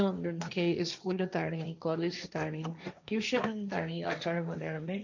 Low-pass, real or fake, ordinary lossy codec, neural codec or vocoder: 7.2 kHz; fake; none; codec, 16 kHz, 1.1 kbps, Voila-Tokenizer